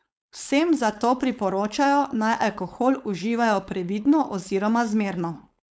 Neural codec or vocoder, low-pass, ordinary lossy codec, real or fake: codec, 16 kHz, 4.8 kbps, FACodec; none; none; fake